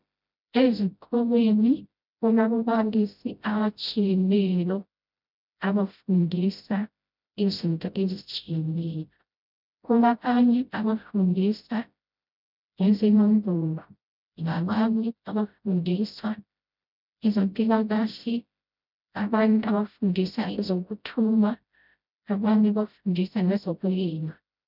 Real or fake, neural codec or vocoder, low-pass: fake; codec, 16 kHz, 0.5 kbps, FreqCodec, smaller model; 5.4 kHz